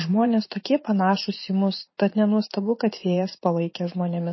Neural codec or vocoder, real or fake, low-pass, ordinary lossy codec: vocoder, 44.1 kHz, 80 mel bands, Vocos; fake; 7.2 kHz; MP3, 24 kbps